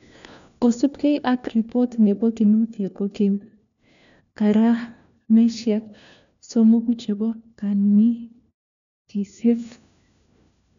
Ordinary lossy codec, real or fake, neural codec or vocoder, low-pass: none; fake; codec, 16 kHz, 1 kbps, FunCodec, trained on LibriTTS, 50 frames a second; 7.2 kHz